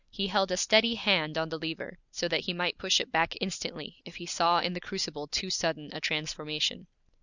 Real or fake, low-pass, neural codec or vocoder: real; 7.2 kHz; none